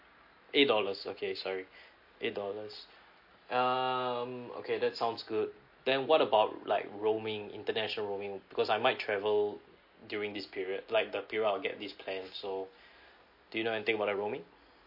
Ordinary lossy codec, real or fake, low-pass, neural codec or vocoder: MP3, 32 kbps; real; 5.4 kHz; none